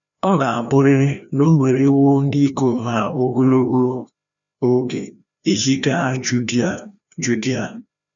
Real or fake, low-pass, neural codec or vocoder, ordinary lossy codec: fake; 7.2 kHz; codec, 16 kHz, 1 kbps, FreqCodec, larger model; none